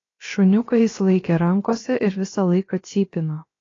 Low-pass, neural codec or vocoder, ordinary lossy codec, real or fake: 7.2 kHz; codec, 16 kHz, about 1 kbps, DyCAST, with the encoder's durations; AAC, 32 kbps; fake